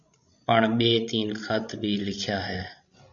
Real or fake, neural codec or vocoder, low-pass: fake; codec, 16 kHz, 16 kbps, FreqCodec, larger model; 7.2 kHz